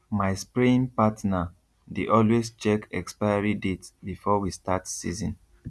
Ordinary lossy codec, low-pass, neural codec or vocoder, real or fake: none; none; none; real